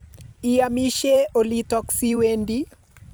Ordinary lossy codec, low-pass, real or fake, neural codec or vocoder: none; none; fake; vocoder, 44.1 kHz, 128 mel bands every 256 samples, BigVGAN v2